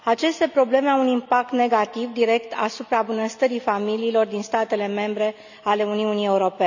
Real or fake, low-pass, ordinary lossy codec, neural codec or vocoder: real; 7.2 kHz; none; none